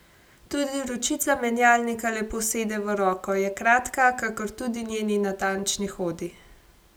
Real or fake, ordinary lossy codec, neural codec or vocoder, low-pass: real; none; none; none